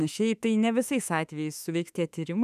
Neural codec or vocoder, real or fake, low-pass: autoencoder, 48 kHz, 32 numbers a frame, DAC-VAE, trained on Japanese speech; fake; 14.4 kHz